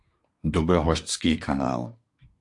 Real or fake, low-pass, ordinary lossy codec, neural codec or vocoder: fake; 10.8 kHz; AAC, 64 kbps; codec, 24 kHz, 1 kbps, SNAC